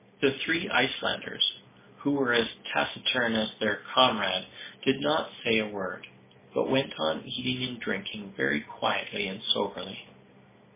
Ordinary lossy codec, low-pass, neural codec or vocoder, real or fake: MP3, 16 kbps; 3.6 kHz; none; real